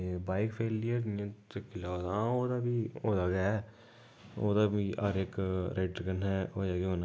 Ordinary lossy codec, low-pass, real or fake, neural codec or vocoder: none; none; real; none